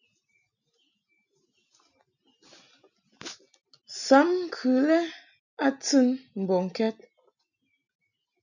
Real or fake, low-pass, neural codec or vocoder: real; 7.2 kHz; none